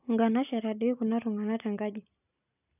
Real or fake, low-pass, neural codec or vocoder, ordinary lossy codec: real; 3.6 kHz; none; none